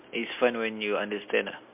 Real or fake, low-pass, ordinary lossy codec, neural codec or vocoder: real; 3.6 kHz; MP3, 32 kbps; none